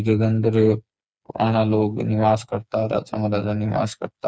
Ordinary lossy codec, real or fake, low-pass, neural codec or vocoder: none; fake; none; codec, 16 kHz, 4 kbps, FreqCodec, smaller model